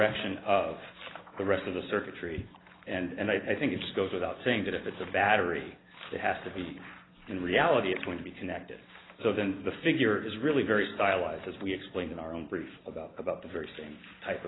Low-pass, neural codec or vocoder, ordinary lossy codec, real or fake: 7.2 kHz; none; AAC, 16 kbps; real